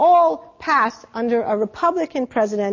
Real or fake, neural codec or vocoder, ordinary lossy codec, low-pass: real; none; MP3, 32 kbps; 7.2 kHz